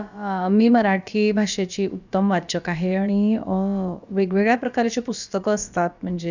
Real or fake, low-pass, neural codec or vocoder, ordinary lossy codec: fake; 7.2 kHz; codec, 16 kHz, about 1 kbps, DyCAST, with the encoder's durations; none